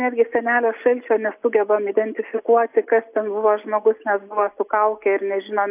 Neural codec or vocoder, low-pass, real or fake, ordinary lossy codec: none; 3.6 kHz; real; AAC, 32 kbps